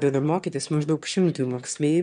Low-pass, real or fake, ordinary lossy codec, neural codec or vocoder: 9.9 kHz; fake; MP3, 64 kbps; autoencoder, 22.05 kHz, a latent of 192 numbers a frame, VITS, trained on one speaker